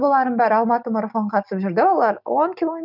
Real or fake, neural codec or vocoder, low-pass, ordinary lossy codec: real; none; 5.4 kHz; none